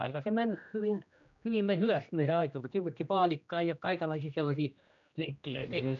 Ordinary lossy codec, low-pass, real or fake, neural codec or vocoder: none; 7.2 kHz; fake; codec, 16 kHz, 1 kbps, X-Codec, HuBERT features, trained on general audio